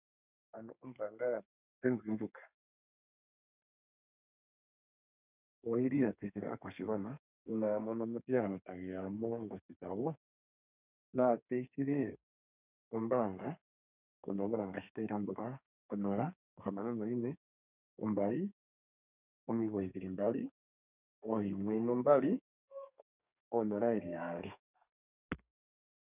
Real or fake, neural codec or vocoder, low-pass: fake; codec, 32 kHz, 1.9 kbps, SNAC; 3.6 kHz